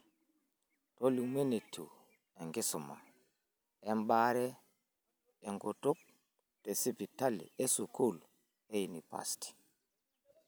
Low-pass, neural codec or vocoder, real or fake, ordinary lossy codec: none; none; real; none